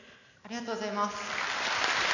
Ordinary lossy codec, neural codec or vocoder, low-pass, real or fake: none; none; 7.2 kHz; real